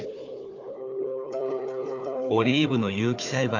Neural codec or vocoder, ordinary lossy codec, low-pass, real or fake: codec, 16 kHz, 4 kbps, FunCodec, trained on Chinese and English, 50 frames a second; none; 7.2 kHz; fake